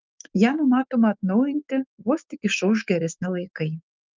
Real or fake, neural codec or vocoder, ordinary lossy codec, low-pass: fake; autoencoder, 48 kHz, 128 numbers a frame, DAC-VAE, trained on Japanese speech; Opus, 24 kbps; 7.2 kHz